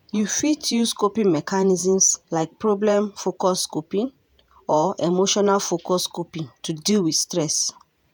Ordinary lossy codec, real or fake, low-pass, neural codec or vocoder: none; fake; none; vocoder, 48 kHz, 128 mel bands, Vocos